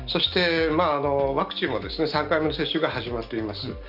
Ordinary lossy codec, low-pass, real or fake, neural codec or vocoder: none; 5.4 kHz; real; none